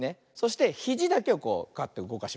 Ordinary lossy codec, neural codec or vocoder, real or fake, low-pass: none; none; real; none